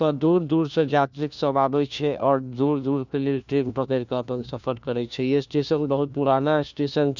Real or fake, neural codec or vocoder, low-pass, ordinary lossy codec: fake; codec, 16 kHz, 1 kbps, FunCodec, trained on LibriTTS, 50 frames a second; 7.2 kHz; none